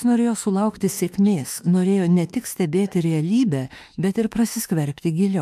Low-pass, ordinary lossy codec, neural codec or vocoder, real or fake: 14.4 kHz; AAC, 96 kbps; autoencoder, 48 kHz, 32 numbers a frame, DAC-VAE, trained on Japanese speech; fake